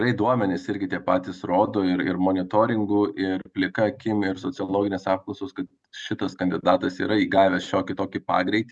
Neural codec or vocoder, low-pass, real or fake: none; 10.8 kHz; real